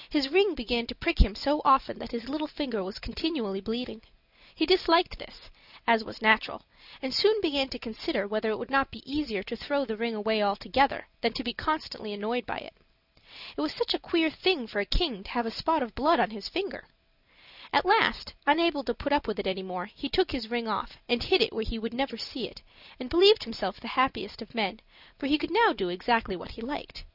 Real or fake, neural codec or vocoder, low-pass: real; none; 5.4 kHz